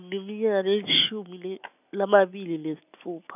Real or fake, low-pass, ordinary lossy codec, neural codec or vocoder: real; 3.6 kHz; none; none